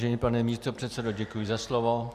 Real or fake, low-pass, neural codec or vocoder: real; 14.4 kHz; none